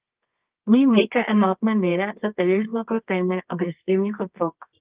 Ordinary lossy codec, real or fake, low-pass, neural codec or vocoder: Opus, 32 kbps; fake; 3.6 kHz; codec, 24 kHz, 0.9 kbps, WavTokenizer, medium music audio release